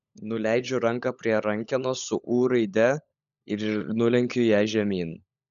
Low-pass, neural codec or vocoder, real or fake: 7.2 kHz; codec, 16 kHz, 16 kbps, FunCodec, trained on LibriTTS, 50 frames a second; fake